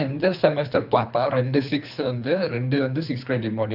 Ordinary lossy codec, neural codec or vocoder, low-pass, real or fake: AAC, 48 kbps; codec, 24 kHz, 3 kbps, HILCodec; 5.4 kHz; fake